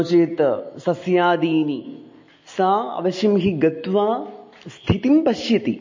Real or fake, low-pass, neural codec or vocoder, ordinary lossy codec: real; 7.2 kHz; none; MP3, 32 kbps